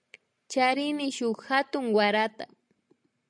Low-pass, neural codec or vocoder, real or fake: 9.9 kHz; none; real